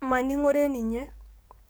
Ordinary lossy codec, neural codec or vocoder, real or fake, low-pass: none; codec, 44.1 kHz, 7.8 kbps, DAC; fake; none